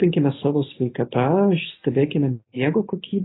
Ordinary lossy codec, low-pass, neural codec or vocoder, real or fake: AAC, 16 kbps; 7.2 kHz; none; real